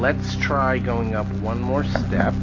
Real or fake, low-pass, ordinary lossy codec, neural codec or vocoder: real; 7.2 kHz; AAC, 32 kbps; none